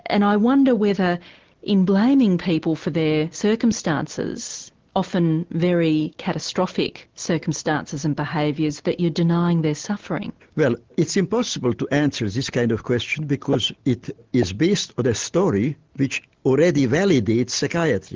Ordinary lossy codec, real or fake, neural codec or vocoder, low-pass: Opus, 16 kbps; real; none; 7.2 kHz